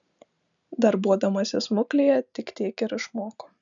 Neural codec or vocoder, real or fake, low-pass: none; real; 7.2 kHz